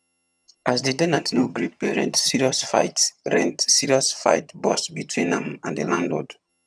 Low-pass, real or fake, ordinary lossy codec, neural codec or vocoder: none; fake; none; vocoder, 22.05 kHz, 80 mel bands, HiFi-GAN